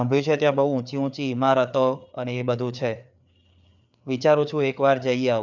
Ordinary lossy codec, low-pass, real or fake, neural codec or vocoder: none; 7.2 kHz; fake; codec, 16 kHz, 4 kbps, FreqCodec, larger model